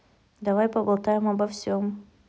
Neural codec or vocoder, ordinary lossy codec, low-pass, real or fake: none; none; none; real